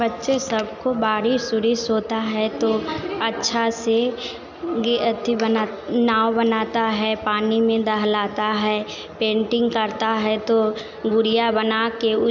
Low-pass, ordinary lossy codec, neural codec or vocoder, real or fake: 7.2 kHz; none; none; real